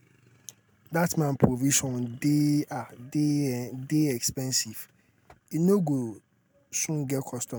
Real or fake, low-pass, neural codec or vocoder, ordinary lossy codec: real; none; none; none